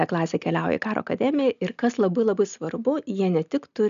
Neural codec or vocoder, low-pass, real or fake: none; 7.2 kHz; real